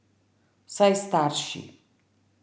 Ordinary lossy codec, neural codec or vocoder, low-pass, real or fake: none; none; none; real